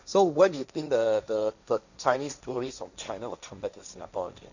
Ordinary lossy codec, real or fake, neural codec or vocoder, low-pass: none; fake; codec, 16 kHz, 1.1 kbps, Voila-Tokenizer; 7.2 kHz